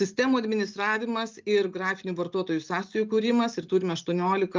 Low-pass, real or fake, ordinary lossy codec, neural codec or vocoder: 7.2 kHz; real; Opus, 24 kbps; none